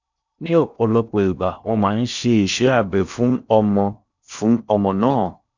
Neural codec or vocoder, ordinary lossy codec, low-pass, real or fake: codec, 16 kHz in and 24 kHz out, 0.6 kbps, FocalCodec, streaming, 2048 codes; none; 7.2 kHz; fake